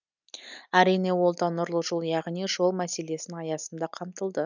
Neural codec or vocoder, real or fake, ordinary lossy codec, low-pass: none; real; none; 7.2 kHz